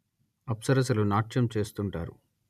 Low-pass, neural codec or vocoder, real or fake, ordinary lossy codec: 14.4 kHz; none; real; none